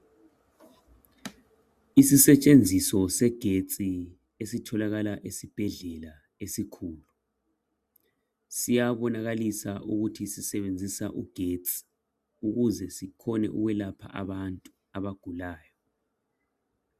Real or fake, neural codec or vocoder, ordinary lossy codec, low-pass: real; none; Opus, 64 kbps; 14.4 kHz